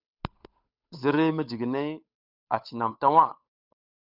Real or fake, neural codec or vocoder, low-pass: fake; codec, 16 kHz, 8 kbps, FunCodec, trained on Chinese and English, 25 frames a second; 5.4 kHz